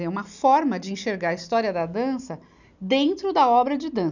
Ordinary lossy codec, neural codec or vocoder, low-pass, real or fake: none; none; 7.2 kHz; real